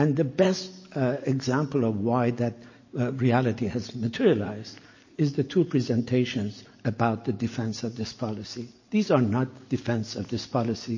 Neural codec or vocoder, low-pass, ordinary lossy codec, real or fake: none; 7.2 kHz; MP3, 32 kbps; real